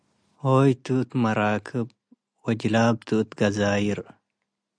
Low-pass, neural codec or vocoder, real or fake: 9.9 kHz; none; real